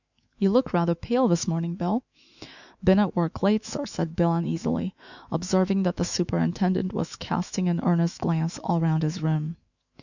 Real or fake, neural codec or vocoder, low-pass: fake; autoencoder, 48 kHz, 128 numbers a frame, DAC-VAE, trained on Japanese speech; 7.2 kHz